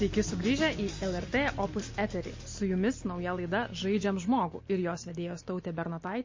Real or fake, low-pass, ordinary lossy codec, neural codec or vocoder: real; 7.2 kHz; MP3, 32 kbps; none